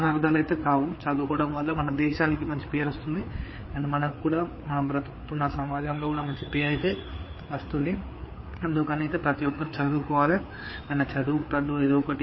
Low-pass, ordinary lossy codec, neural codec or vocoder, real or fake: 7.2 kHz; MP3, 24 kbps; codec, 16 kHz, 4 kbps, FreqCodec, larger model; fake